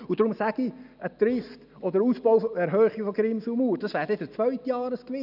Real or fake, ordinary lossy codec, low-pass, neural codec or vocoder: real; none; 5.4 kHz; none